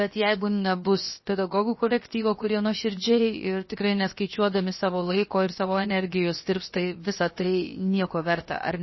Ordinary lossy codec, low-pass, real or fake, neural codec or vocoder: MP3, 24 kbps; 7.2 kHz; fake; codec, 16 kHz, 0.7 kbps, FocalCodec